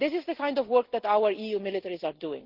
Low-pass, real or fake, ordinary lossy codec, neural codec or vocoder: 5.4 kHz; real; Opus, 16 kbps; none